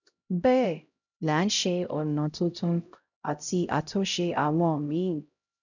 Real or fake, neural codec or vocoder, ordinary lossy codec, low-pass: fake; codec, 16 kHz, 0.5 kbps, X-Codec, HuBERT features, trained on LibriSpeech; none; 7.2 kHz